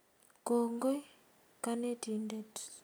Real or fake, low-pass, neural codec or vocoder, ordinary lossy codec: real; none; none; none